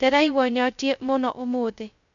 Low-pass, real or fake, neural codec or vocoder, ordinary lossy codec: 7.2 kHz; fake; codec, 16 kHz, 0.2 kbps, FocalCodec; none